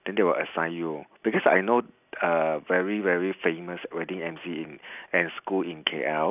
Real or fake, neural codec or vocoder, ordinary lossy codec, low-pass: real; none; none; 3.6 kHz